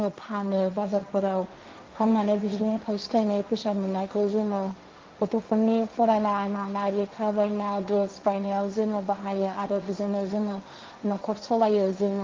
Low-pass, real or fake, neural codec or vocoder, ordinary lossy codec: 7.2 kHz; fake; codec, 16 kHz, 1.1 kbps, Voila-Tokenizer; Opus, 32 kbps